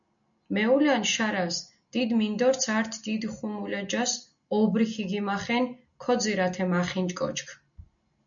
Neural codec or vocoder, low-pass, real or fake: none; 7.2 kHz; real